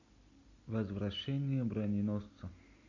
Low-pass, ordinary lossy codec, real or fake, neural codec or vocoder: 7.2 kHz; MP3, 64 kbps; real; none